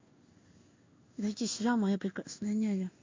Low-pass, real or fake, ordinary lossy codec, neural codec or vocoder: 7.2 kHz; fake; MP3, 48 kbps; codec, 16 kHz in and 24 kHz out, 1 kbps, XY-Tokenizer